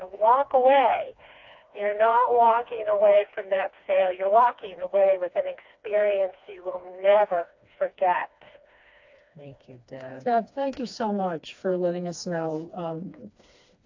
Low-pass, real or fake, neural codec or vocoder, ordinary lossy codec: 7.2 kHz; fake; codec, 16 kHz, 2 kbps, FreqCodec, smaller model; AAC, 48 kbps